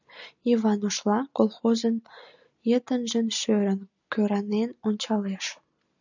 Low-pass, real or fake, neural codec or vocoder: 7.2 kHz; real; none